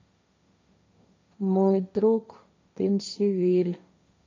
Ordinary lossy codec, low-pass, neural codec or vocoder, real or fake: MP3, 48 kbps; 7.2 kHz; codec, 16 kHz, 1.1 kbps, Voila-Tokenizer; fake